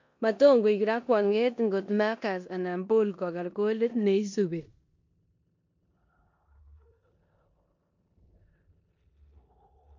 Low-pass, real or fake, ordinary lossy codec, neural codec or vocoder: 7.2 kHz; fake; MP3, 48 kbps; codec, 16 kHz in and 24 kHz out, 0.9 kbps, LongCat-Audio-Codec, four codebook decoder